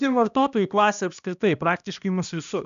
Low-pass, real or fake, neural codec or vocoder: 7.2 kHz; fake; codec, 16 kHz, 1 kbps, X-Codec, HuBERT features, trained on balanced general audio